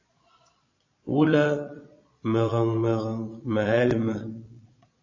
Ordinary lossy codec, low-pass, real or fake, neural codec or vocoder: MP3, 32 kbps; 7.2 kHz; fake; vocoder, 22.05 kHz, 80 mel bands, Vocos